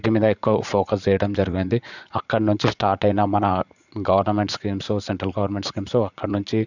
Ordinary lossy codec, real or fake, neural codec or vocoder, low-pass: none; fake; vocoder, 44.1 kHz, 80 mel bands, Vocos; 7.2 kHz